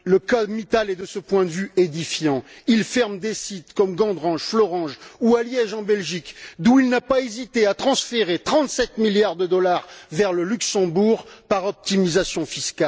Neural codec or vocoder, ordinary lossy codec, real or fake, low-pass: none; none; real; none